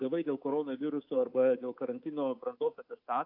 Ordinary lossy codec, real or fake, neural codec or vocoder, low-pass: AAC, 48 kbps; fake; codec, 24 kHz, 3.1 kbps, DualCodec; 5.4 kHz